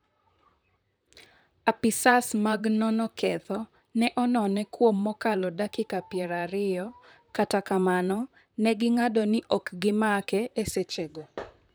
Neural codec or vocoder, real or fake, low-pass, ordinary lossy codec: vocoder, 44.1 kHz, 128 mel bands, Pupu-Vocoder; fake; none; none